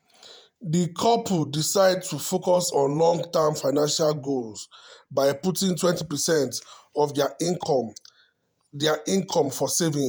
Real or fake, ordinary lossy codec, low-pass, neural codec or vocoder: fake; none; none; vocoder, 48 kHz, 128 mel bands, Vocos